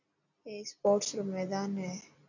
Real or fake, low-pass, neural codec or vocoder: real; 7.2 kHz; none